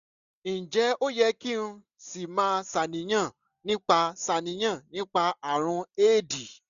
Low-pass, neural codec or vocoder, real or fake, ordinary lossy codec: 7.2 kHz; none; real; none